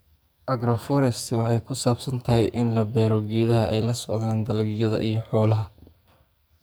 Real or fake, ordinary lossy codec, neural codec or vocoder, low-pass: fake; none; codec, 44.1 kHz, 2.6 kbps, SNAC; none